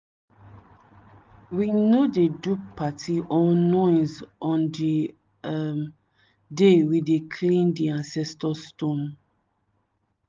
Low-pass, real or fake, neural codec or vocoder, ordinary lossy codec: 7.2 kHz; real; none; Opus, 24 kbps